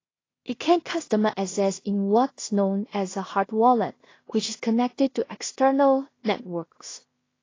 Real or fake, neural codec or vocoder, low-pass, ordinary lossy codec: fake; codec, 16 kHz in and 24 kHz out, 0.4 kbps, LongCat-Audio-Codec, two codebook decoder; 7.2 kHz; AAC, 32 kbps